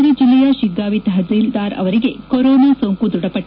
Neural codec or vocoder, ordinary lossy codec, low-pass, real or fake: none; none; 5.4 kHz; real